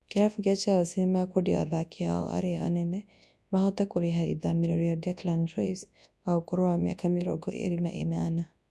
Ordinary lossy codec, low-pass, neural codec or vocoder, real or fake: none; none; codec, 24 kHz, 0.9 kbps, WavTokenizer, large speech release; fake